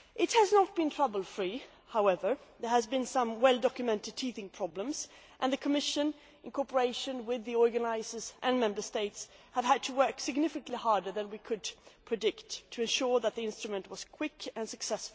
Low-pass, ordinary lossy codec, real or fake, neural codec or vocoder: none; none; real; none